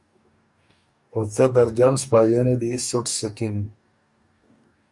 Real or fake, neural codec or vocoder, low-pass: fake; codec, 44.1 kHz, 2.6 kbps, DAC; 10.8 kHz